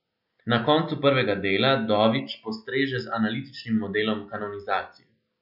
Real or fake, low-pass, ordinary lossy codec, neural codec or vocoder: real; 5.4 kHz; none; none